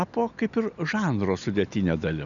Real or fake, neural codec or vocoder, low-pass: real; none; 7.2 kHz